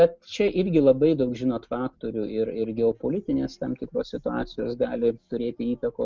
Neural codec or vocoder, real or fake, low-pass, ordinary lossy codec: none; real; 7.2 kHz; Opus, 32 kbps